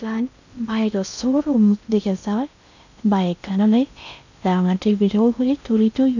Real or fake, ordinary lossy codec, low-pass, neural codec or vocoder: fake; none; 7.2 kHz; codec, 16 kHz in and 24 kHz out, 0.6 kbps, FocalCodec, streaming, 2048 codes